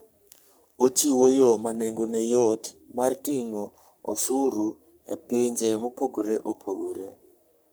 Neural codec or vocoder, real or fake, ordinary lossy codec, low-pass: codec, 44.1 kHz, 3.4 kbps, Pupu-Codec; fake; none; none